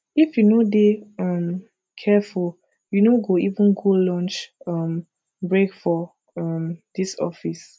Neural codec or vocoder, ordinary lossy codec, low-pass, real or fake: none; none; none; real